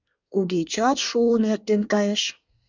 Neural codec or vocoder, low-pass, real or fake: codec, 24 kHz, 1 kbps, SNAC; 7.2 kHz; fake